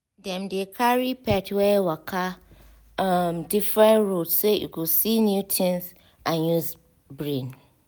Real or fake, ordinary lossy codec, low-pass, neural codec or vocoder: real; none; none; none